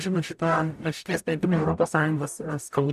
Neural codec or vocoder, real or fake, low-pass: codec, 44.1 kHz, 0.9 kbps, DAC; fake; 14.4 kHz